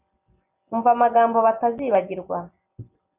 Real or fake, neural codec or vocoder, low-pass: real; none; 3.6 kHz